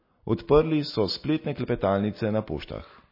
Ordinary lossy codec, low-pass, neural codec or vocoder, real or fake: MP3, 24 kbps; 5.4 kHz; none; real